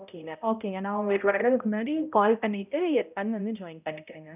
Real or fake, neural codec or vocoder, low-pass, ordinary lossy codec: fake; codec, 16 kHz, 0.5 kbps, X-Codec, HuBERT features, trained on balanced general audio; 3.6 kHz; none